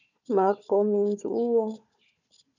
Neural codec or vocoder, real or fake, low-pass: codec, 16 kHz, 4 kbps, FunCodec, trained on Chinese and English, 50 frames a second; fake; 7.2 kHz